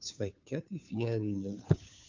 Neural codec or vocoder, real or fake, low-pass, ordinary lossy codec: codec, 16 kHz, 2 kbps, FunCodec, trained on Chinese and English, 25 frames a second; fake; 7.2 kHz; MP3, 64 kbps